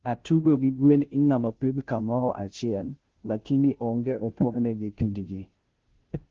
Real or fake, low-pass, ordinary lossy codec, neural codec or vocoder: fake; 7.2 kHz; Opus, 16 kbps; codec, 16 kHz, 0.5 kbps, FunCodec, trained on Chinese and English, 25 frames a second